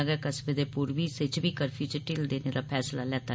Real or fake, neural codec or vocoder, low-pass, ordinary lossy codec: real; none; none; none